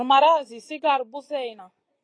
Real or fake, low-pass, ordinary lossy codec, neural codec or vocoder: real; 9.9 kHz; AAC, 32 kbps; none